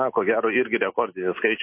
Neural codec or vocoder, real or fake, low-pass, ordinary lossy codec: none; real; 3.6 kHz; MP3, 24 kbps